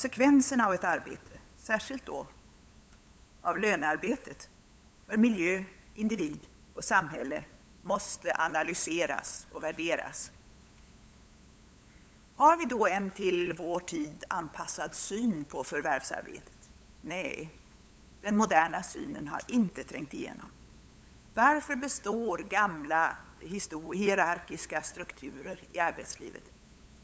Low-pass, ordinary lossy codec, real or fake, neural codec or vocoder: none; none; fake; codec, 16 kHz, 8 kbps, FunCodec, trained on LibriTTS, 25 frames a second